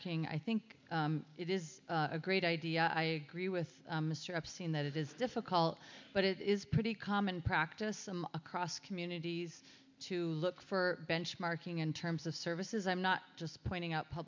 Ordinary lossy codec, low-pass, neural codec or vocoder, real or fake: MP3, 64 kbps; 7.2 kHz; none; real